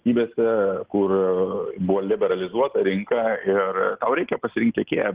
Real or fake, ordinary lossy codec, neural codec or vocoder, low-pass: real; Opus, 16 kbps; none; 3.6 kHz